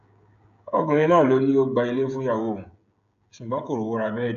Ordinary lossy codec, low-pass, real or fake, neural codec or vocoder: AAC, 64 kbps; 7.2 kHz; fake; codec, 16 kHz, 16 kbps, FreqCodec, smaller model